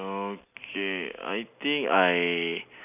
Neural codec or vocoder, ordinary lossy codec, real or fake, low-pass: none; none; real; 3.6 kHz